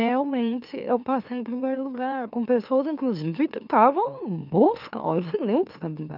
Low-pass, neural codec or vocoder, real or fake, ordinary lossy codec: 5.4 kHz; autoencoder, 44.1 kHz, a latent of 192 numbers a frame, MeloTTS; fake; none